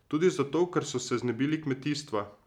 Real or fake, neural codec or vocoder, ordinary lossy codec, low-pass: real; none; none; 19.8 kHz